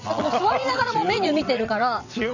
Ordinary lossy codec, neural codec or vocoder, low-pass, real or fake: none; vocoder, 22.05 kHz, 80 mel bands, WaveNeXt; 7.2 kHz; fake